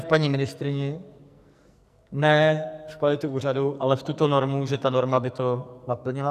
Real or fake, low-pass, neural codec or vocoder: fake; 14.4 kHz; codec, 44.1 kHz, 2.6 kbps, SNAC